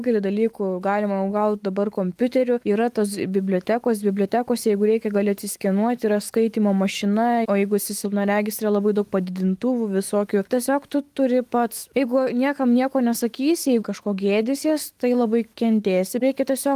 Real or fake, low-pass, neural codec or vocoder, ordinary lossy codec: fake; 14.4 kHz; autoencoder, 48 kHz, 128 numbers a frame, DAC-VAE, trained on Japanese speech; Opus, 24 kbps